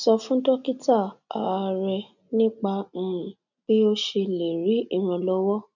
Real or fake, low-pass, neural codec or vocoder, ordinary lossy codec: real; 7.2 kHz; none; none